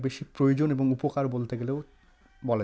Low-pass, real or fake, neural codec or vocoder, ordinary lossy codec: none; real; none; none